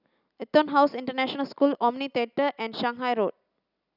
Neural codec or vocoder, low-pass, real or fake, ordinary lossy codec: none; 5.4 kHz; real; none